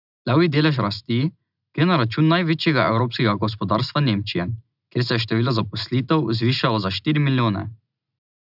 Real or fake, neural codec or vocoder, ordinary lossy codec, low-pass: real; none; none; 5.4 kHz